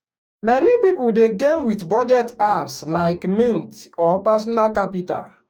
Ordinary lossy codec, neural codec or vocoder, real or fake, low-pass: none; codec, 44.1 kHz, 2.6 kbps, DAC; fake; 19.8 kHz